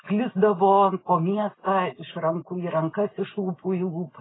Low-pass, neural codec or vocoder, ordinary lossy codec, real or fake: 7.2 kHz; none; AAC, 16 kbps; real